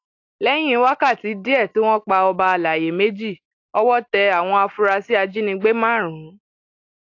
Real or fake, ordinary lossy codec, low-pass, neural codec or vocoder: real; AAC, 48 kbps; 7.2 kHz; none